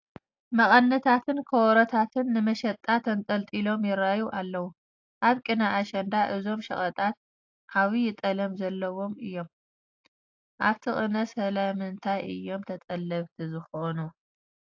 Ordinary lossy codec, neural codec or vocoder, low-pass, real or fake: AAC, 48 kbps; none; 7.2 kHz; real